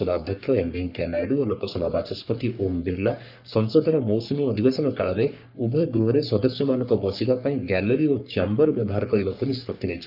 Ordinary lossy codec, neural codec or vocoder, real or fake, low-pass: none; codec, 44.1 kHz, 3.4 kbps, Pupu-Codec; fake; 5.4 kHz